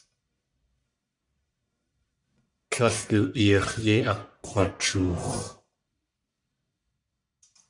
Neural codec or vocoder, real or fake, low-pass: codec, 44.1 kHz, 1.7 kbps, Pupu-Codec; fake; 10.8 kHz